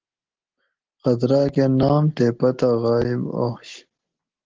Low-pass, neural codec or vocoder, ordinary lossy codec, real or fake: 7.2 kHz; none; Opus, 16 kbps; real